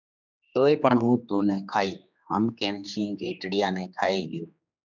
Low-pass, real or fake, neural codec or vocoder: 7.2 kHz; fake; codec, 16 kHz, 2 kbps, X-Codec, HuBERT features, trained on general audio